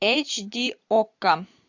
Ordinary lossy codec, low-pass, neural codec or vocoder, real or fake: AAC, 48 kbps; 7.2 kHz; vocoder, 44.1 kHz, 128 mel bands every 512 samples, BigVGAN v2; fake